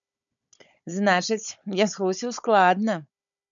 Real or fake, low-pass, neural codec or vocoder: fake; 7.2 kHz; codec, 16 kHz, 16 kbps, FunCodec, trained on Chinese and English, 50 frames a second